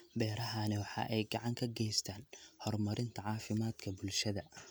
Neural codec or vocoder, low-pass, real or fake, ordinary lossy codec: none; none; real; none